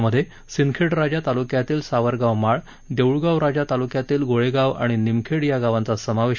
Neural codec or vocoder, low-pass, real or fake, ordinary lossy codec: none; 7.2 kHz; real; none